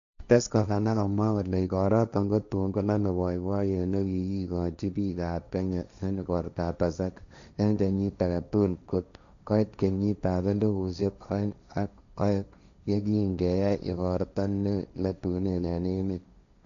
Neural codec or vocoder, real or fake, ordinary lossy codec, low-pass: codec, 16 kHz, 1.1 kbps, Voila-Tokenizer; fake; none; 7.2 kHz